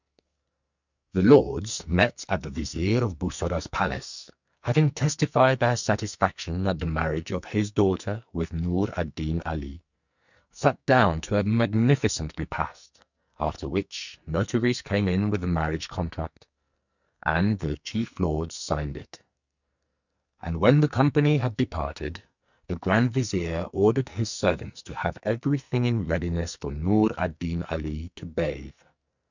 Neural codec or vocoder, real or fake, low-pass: codec, 44.1 kHz, 2.6 kbps, SNAC; fake; 7.2 kHz